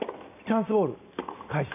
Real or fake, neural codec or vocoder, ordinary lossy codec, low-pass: real; none; none; 3.6 kHz